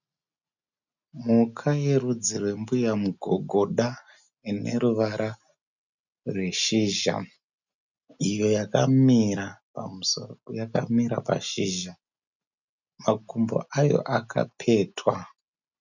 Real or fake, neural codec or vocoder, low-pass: real; none; 7.2 kHz